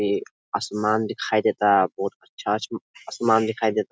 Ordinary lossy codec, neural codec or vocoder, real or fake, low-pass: none; none; real; none